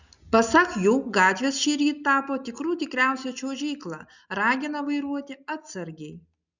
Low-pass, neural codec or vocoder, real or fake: 7.2 kHz; none; real